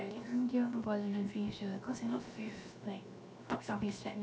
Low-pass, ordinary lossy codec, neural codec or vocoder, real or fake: none; none; codec, 16 kHz, 0.7 kbps, FocalCodec; fake